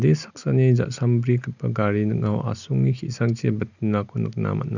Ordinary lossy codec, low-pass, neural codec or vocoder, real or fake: none; 7.2 kHz; none; real